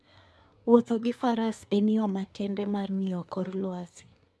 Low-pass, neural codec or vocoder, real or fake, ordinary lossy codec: none; codec, 24 kHz, 1 kbps, SNAC; fake; none